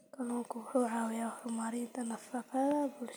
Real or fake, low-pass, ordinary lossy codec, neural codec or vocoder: real; none; none; none